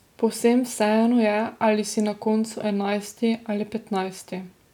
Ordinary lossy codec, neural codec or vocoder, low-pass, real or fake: none; none; 19.8 kHz; real